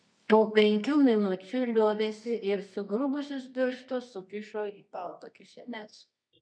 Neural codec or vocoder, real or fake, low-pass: codec, 24 kHz, 0.9 kbps, WavTokenizer, medium music audio release; fake; 9.9 kHz